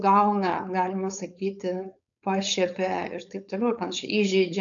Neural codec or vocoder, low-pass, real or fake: codec, 16 kHz, 4.8 kbps, FACodec; 7.2 kHz; fake